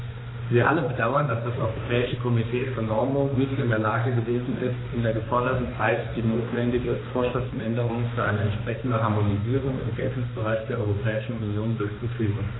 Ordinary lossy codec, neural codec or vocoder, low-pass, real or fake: AAC, 16 kbps; codec, 16 kHz, 2 kbps, X-Codec, HuBERT features, trained on balanced general audio; 7.2 kHz; fake